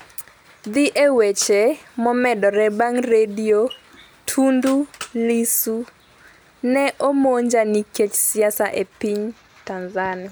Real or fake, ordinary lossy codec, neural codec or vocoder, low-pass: real; none; none; none